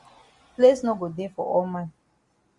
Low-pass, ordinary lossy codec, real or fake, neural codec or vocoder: 10.8 kHz; Opus, 64 kbps; real; none